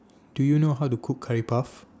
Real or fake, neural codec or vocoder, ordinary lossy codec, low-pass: real; none; none; none